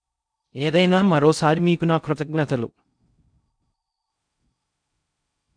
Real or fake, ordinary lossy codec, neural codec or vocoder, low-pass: fake; none; codec, 16 kHz in and 24 kHz out, 0.6 kbps, FocalCodec, streaming, 4096 codes; 9.9 kHz